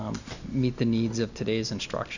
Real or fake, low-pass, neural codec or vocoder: real; 7.2 kHz; none